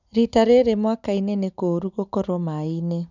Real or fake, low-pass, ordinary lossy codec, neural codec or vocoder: real; 7.2 kHz; none; none